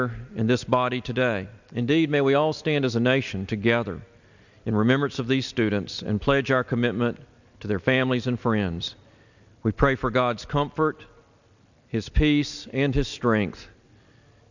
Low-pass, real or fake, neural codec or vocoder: 7.2 kHz; real; none